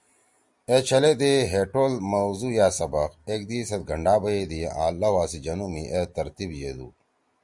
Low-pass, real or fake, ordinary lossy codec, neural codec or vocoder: 10.8 kHz; real; Opus, 64 kbps; none